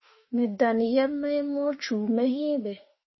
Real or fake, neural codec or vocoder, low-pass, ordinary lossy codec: fake; autoencoder, 48 kHz, 32 numbers a frame, DAC-VAE, trained on Japanese speech; 7.2 kHz; MP3, 24 kbps